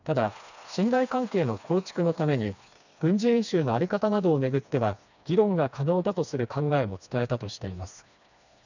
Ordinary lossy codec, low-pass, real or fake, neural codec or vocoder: none; 7.2 kHz; fake; codec, 16 kHz, 2 kbps, FreqCodec, smaller model